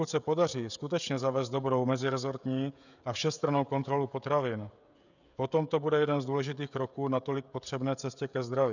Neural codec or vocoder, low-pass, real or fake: codec, 16 kHz, 16 kbps, FreqCodec, smaller model; 7.2 kHz; fake